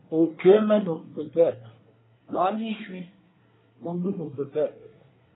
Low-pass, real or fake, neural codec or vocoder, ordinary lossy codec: 7.2 kHz; fake; codec, 24 kHz, 1 kbps, SNAC; AAC, 16 kbps